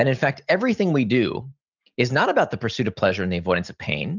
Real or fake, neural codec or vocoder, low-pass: real; none; 7.2 kHz